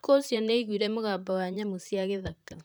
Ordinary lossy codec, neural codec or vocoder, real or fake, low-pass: none; vocoder, 44.1 kHz, 128 mel bands, Pupu-Vocoder; fake; none